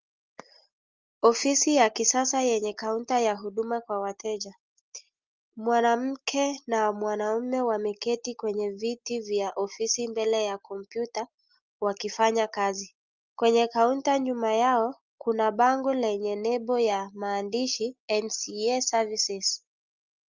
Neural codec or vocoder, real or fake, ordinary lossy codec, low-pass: none; real; Opus, 24 kbps; 7.2 kHz